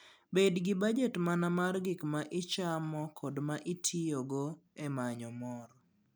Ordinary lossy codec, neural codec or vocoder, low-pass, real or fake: none; none; none; real